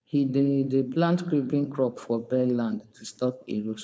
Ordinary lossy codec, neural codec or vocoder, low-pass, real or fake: none; codec, 16 kHz, 4.8 kbps, FACodec; none; fake